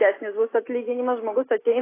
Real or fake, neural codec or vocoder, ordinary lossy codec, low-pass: real; none; AAC, 16 kbps; 3.6 kHz